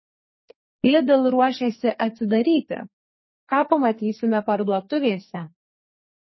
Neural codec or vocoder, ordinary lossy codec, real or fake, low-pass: codec, 44.1 kHz, 2.6 kbps, SNAC; MP3, 24 kbps; fake; 7.2 kHz